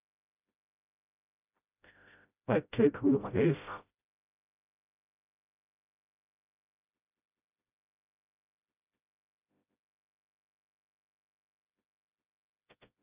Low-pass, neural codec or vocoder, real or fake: 3.6 kHz; codec, 16 kHz, 0.5 kbps, FreqCodec, smaller model; fake